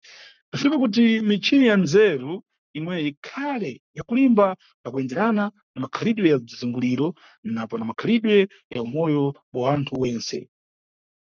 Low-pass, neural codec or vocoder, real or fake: 7.2 kHz; codec, 44.1 kHz, 3.4 kbps, Pupu-Codec; fake